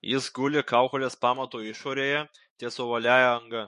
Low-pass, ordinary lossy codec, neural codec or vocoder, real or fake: 14.4 kHz; MP3, 48 kbps; autoencoder, 48 kHz, 128 numbers a frame, DAC-VAE, trained on Japanese speech; fake